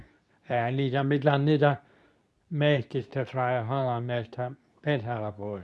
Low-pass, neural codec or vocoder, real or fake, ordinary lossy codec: none; codec, 24 kHz, 0.9 kbps, WavTokenizer, medium speech release version 2; fake; none